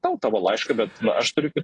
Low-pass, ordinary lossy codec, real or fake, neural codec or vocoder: 10.8 kHz; AAC, 32 kbps; real; none